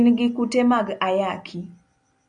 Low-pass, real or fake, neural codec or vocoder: 9.9 kHz; real; none